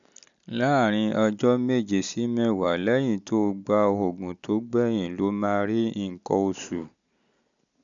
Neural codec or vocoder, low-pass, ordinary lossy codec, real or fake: none; 7.2 kHz; none; real